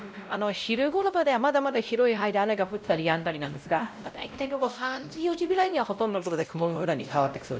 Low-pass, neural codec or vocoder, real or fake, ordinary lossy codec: none; codec, 16 kHz, 0.5 kbps, X-Codec, WavLM features, trained on Multilingual LibriSpeech; fake; none